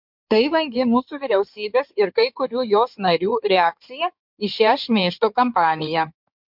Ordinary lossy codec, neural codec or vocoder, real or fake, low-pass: MP3, 48 kbps; codec, 16 kHz in and 24 kHz out, 2.2 kbps, FireRedTTS-2 codec; fake; 5.4 kHz